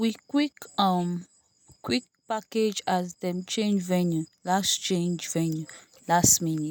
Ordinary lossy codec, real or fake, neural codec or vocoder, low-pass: none; real; none; none